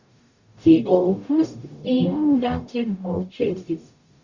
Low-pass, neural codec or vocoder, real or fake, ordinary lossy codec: 7.2 kHz; codec, 44.1 kHz, 0.9 kbps, DAC; fake; Opus, 64 kbps